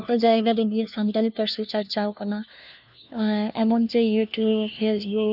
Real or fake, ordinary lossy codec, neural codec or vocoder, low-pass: fake; none; codec, 16 kHz, 1 kbps, FunCodec, trained on LibriTTS, 50 frames a second; 5.4 kHz